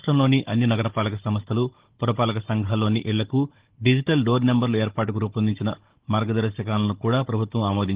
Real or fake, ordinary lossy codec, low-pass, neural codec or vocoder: fake; Opus, 16 kbps; 3.6 kHz; codec, 16 kHz, 16 kbps, FunCodec, trained on Chinese and English, 50 frames a second